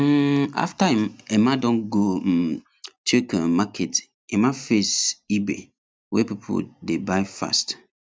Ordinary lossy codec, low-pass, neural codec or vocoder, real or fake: none; none; none; real